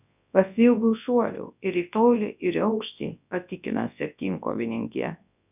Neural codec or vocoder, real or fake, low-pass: codec, 24 kHz, 0.9 kbps, WavTokenizer, large speech release; fake; 3.6 kHz